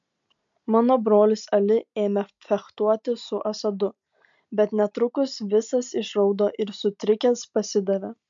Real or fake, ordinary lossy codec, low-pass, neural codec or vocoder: real; MP3, 64 kbps; 7.2 kHz; none